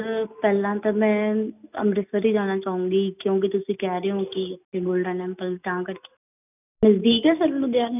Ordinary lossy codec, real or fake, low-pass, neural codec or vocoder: none; real; 3.6 kHz; none